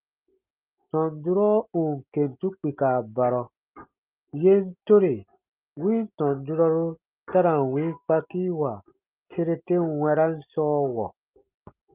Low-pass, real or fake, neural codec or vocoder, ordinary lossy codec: 3.6 kHz; real; none; Opus, 24 kbps